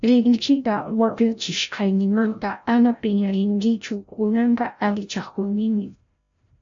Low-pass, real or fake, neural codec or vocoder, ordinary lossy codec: 7.2 kHz; fake; codec, 16 kHz, 0.5 kbps, FreqCodec, larger model; AAC, 64 kbps